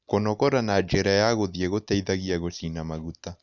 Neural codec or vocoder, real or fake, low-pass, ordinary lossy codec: none; real; 7.2 kHz; none